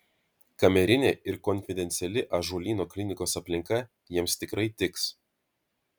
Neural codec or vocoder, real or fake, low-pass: none; real; 19.8 kHz